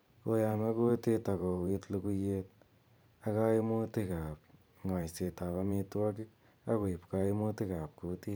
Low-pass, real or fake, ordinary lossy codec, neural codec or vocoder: none; fake; none; vocoder, 44.1 kHz, 128 mel bands every 512 samples, BigVGAN v2